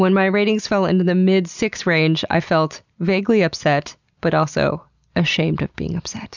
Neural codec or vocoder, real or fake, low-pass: none; real; 7.2 kHz